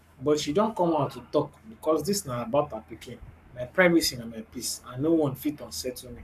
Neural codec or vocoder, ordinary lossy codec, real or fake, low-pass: codec, 44.1 kHz, 7.8 kbps, Pupu-Codec; none; fake; 14.4 kHz